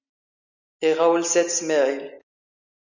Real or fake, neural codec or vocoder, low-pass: real; none; 7.2 kHz